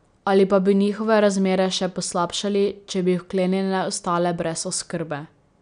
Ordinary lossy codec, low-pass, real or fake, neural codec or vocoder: none; 9.9 kHz; real; none